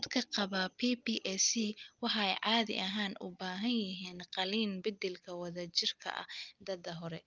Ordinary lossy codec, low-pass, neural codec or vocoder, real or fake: Opus, 24 kbps; 7.2 kHz; none; real